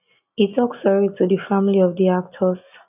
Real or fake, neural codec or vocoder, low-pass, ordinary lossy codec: real; none; 3.6 kHz; none